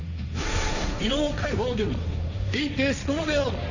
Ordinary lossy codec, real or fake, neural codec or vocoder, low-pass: none; fake; codec, 16 kHz, 1.1 kbps, Voila-Tokenizer; 7.2 kHz